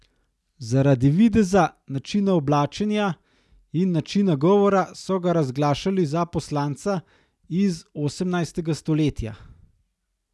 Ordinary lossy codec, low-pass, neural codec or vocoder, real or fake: none; none; none; real